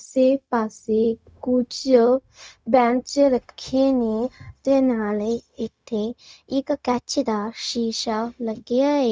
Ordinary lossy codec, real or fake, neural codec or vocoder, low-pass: none; fake; codec, 16 kHz, 0.4 kbps, LongCat-Audio-Codec; none